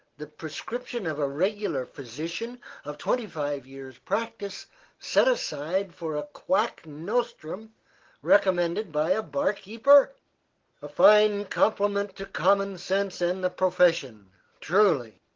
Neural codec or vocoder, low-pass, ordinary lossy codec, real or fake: none; 7.2 kHz; Opus, 16 kbps; real